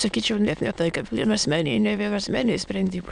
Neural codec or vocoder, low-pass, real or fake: autoencoder, 22.05 kHz, a latent of 192 numbers a frame, VITS, trained on many speakers; 9.9 kHz; fake